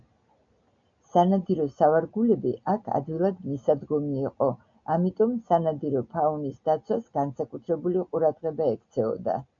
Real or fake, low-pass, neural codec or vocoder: real; 7.2 kHz; none